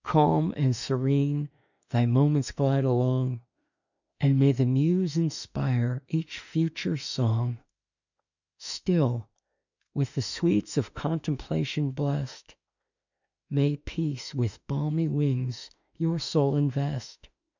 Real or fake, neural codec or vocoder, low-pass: fake; autoencoder, 48 kHz, 32 numbers a frame, DAC-VAE, trained on Japanese speech; 7.2 kHz